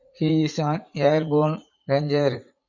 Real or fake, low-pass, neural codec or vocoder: fake; 7.2 kHz; vocoder, 44.1 kHz, 80 mel bands, Vocos